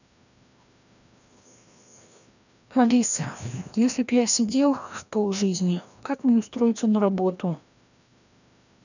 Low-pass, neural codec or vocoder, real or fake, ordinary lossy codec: 7.2 kHz; codec, 16 kHz, 1 kbps, FreqCodec, larger model; fake; none